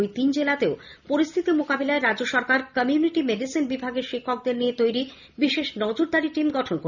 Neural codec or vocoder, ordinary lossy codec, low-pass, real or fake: none; none; 7.2 kHz; real